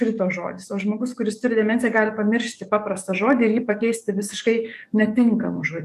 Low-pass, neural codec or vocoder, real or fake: 14.4 kHz; codec, 44.1 kHz, 7.8 kbps, DAC; fake